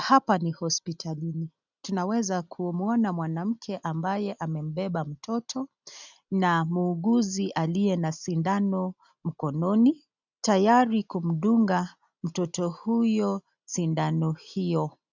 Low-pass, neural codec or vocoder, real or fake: 7.2 kHz; none; real